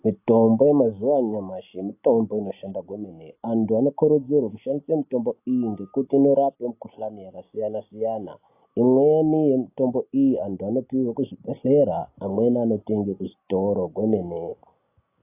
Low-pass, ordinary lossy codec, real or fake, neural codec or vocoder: 3.6 kHz; AAC, 24 kbps; real; none